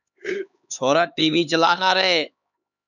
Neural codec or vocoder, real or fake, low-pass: codec, 16 kHz, 4 kbps, X-Codec, HuBERT features, trained on LibriSpeech; fake; 7.2 kHz